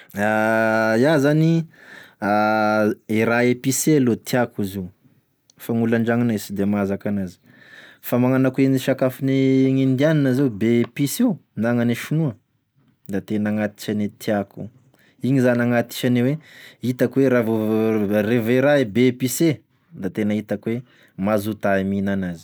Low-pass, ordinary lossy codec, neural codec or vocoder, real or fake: none; none; none; real